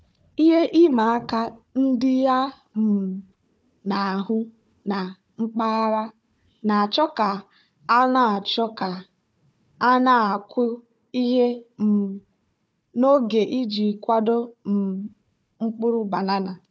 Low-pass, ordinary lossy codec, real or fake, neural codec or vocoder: none; none; fake; codec, 16 kHz, 4 kbps, FunCodec, trained on Chinese and English, 50 frames a second